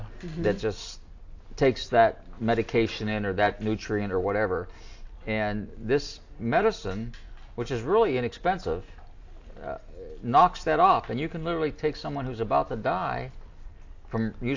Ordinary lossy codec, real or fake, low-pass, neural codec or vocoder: AAC, 48 kbps; real; 7.2 kHz; none